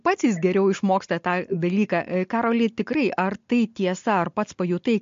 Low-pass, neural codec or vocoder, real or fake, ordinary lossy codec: 7.2 kHz; none; real; MP3, 48 kbps